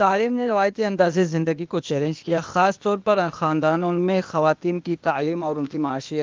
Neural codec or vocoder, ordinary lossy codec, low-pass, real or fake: codec, 16 kHz, 0.8 kbps, ZipCodec; Opus, 32 kbps; 7.2 kHz; fake